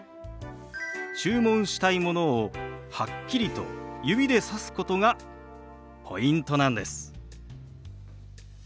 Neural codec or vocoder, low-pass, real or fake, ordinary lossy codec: none; none; real; none